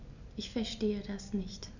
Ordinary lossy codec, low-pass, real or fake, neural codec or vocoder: none; 7.2 kHz; real; none